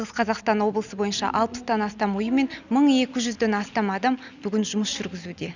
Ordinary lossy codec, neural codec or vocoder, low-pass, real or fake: none; none; 7.2 kHz; real